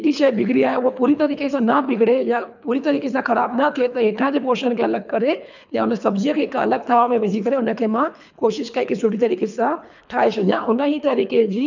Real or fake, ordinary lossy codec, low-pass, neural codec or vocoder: fake; none; 7.2 kHz; codec, 24 kHz, 3 kbps, HILCodec